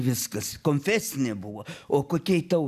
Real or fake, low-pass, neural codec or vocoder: real; 14.4 kHz; none